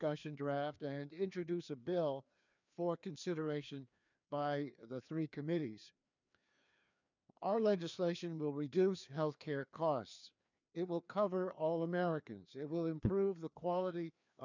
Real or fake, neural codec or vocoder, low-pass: fake; codec, 16 kHz, 2 kbps, FreqCodec, larger model; 7.2 kHz